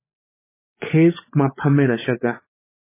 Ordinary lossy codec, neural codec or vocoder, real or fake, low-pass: MP3, 16 kbps; codec, 16 kHz, 16 kbps, FunCodec, trained on LibriTTS, 50 frames a second; fake; 3.6 kHz